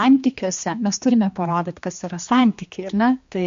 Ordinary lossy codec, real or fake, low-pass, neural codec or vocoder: MP3, 48 kbps; fake; 7.2 kHz; codec, 16 kHz, 2 kbps, X-Codec, HuBERT features, trained on general audio